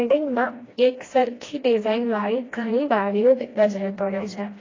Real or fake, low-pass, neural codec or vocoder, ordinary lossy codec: fake; 7.2 kHz; codec, 16 kHz, 1 kbps, FreqCodec, smaller model; AAC, 32 kbps